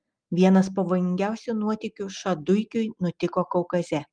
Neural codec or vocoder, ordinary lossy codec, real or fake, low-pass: none; Opus, 32 kbps; real; 9.9 kHz